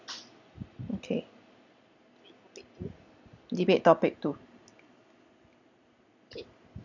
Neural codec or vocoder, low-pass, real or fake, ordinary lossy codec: none; 7.2 kHz; real; none